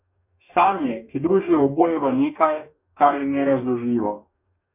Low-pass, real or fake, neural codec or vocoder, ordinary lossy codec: 3.6 kHz; fake; codec, 44.1 kHz, 2.6 kbps, DAC; none